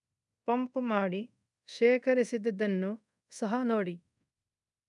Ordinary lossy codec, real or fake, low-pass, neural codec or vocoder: none; fake; 10.8 kHz; codec, 24 kHz, 0.5 kbps, DualCodec